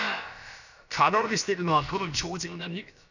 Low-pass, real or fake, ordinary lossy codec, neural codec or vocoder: 7.2 kHz; fake; none; codec, 16 kHz, about 1 kbps, DyCAST, with the encoder's durations